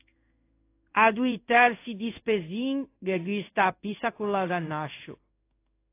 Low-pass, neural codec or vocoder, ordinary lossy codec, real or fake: 3.6 kHz; codec, 16 kHz in and 24 kHz out, 1 kbps, XY-Tokenizer; AAC, 24 kbps; fake